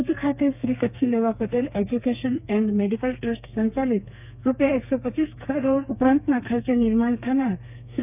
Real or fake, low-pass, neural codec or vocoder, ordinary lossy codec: fake; 3.6 kHz; codec, 32 kHz, 1.9 kbps, SNAC; none